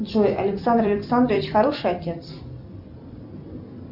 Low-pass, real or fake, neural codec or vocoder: 5.4 kHz; fake; vocoder, 44.1 kHz, 128 mel bands every 256 samples, BigVGAN v2